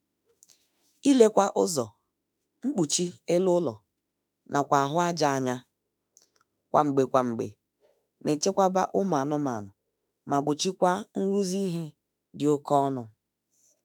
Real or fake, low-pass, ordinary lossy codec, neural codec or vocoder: fake; none; none; autoencoder, 48 kHz, 32 numbers a frame, DAC-VAE, trained on Japanese speech